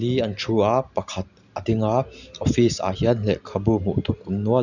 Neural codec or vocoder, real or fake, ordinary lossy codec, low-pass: none; real; none; 7.2 kHz